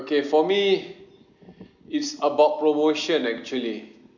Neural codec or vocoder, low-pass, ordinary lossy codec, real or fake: none; 7.2 kHz; none; real